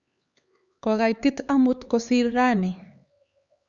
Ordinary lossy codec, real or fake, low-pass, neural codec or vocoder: Opus, 64 kbps; fake; 7.2 kHz; codec, 16 kHz, 4 kbps, X-Codec, HuBERT features, trained on LibriSpeech